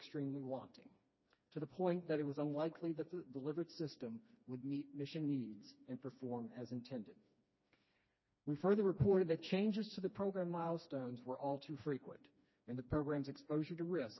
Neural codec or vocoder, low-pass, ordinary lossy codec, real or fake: codec, 16 kHz, 2 kbps, FreqCodec, smaller model; 7.2 kHz; MP3, 24 kbps; fake